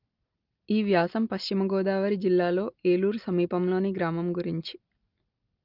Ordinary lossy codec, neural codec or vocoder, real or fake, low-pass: Opus, 24 kbps; none; real; 5.4 kHz